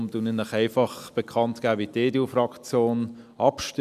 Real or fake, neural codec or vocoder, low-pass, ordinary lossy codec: real; none; 14.4 kHz; none